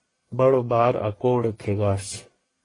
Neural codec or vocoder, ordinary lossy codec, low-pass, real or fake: codec, 44.1 kHz, 1.7 kbps, Pupu-Codec; AAC, 32 kbps; 10.8 kHz; fake